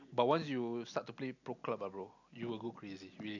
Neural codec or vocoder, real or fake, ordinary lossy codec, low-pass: none; real; none; 7.2 kHz